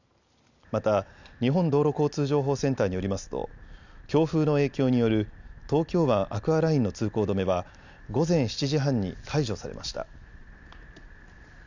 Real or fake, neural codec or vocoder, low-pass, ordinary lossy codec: real; none; 7.2 kHz; none